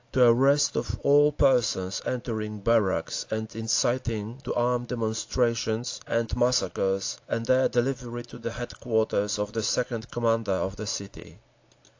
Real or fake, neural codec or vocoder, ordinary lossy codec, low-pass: real; none; AAC, 48 kbps; 7.2 kHz